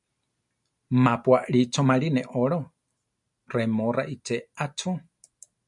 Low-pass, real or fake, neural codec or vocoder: 10.8 kHz; real; none